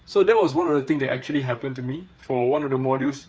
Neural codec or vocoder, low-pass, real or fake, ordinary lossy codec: codec, 16 kHz, 4 kbps, FreqCodec, larger model; none; fake; none